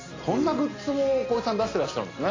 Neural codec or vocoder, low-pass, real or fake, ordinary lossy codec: none; 7.2 kHz; real; AAC, 32 kbps